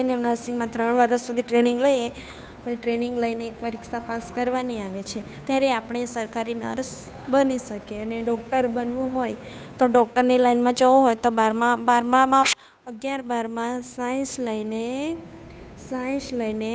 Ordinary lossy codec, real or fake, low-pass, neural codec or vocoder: none; fake; none; codec, 16 kHz, 2 kbps, FunCodec, trained on Chinese and English, 25 frames a second